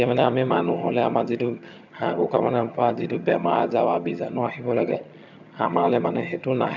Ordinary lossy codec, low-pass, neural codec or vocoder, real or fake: none; 7.2 kHz; vocoder, 22.05 kHz, 80 mel bands, HiFi-GAN; fake